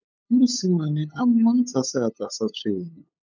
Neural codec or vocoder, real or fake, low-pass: codec, 16 kHz, 8 kbps, FunCodec, trained on LibriTTS, 25 frames a second; fake; 7.2 kHz